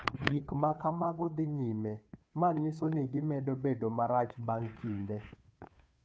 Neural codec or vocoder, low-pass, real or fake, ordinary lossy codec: codec, 16 kHz, 2 kbps, FunCodec, trained on Chinese and English, 25 frames a second; none; fake; none